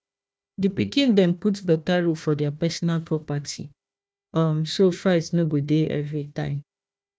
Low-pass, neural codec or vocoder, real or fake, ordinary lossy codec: none; codec, 16 kHz, 1 kbps, FunCodec, trained on Chinese and English, 50 frames a second; fake; none